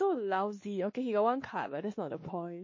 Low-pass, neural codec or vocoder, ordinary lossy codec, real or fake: 7.2 kHz; codec, 16 kHz, 2 kbps, X-Codec, WavLM features, trained on Multilingual LibriSpeech; MP3, 32 kbps; fake